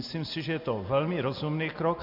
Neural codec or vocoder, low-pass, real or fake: none; 5.4 kHz; real